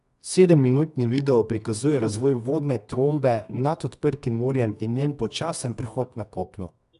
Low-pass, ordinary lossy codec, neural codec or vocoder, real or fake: 10.8 kHz; none; codec, 24 kHz, 0.9 kbps, WavTokenizer, medium music audio release; fake